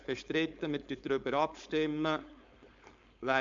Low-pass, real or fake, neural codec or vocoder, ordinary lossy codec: 7.2 kHz; fake; codec, 16 kHz, 4.8 kbps, FACodec; MP3, 64 kbps